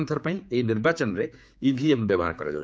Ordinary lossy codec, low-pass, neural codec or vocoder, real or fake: none; none; codec, 16 kHz, 4 kbps, X-Codec, HuBERT features, trained on general audio; fake